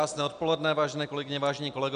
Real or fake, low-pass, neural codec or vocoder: real; 9.9 kHz; none